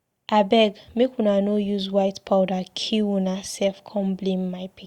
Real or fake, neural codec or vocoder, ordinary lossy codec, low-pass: real; none; Opus, 64 kbps; 19.8 kHz